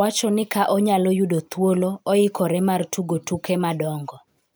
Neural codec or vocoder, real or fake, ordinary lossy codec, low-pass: none; real; none; none